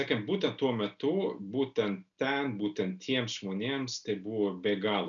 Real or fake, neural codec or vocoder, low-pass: real; none; 7.2 kHz